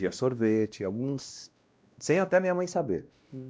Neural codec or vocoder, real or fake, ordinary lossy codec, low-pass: codec, 16 kHz, 1 kbps, X-Codec, WavLM features, trained on Multilingual LibriSpeech; fake; none; none